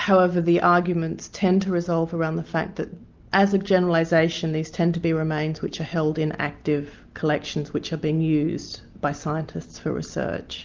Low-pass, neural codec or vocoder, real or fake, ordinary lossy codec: 7.2 kHz; none; real; Opus, 24 kbps